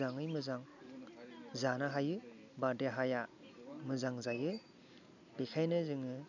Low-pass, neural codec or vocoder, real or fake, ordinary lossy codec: 7.2 kHz; none; real; none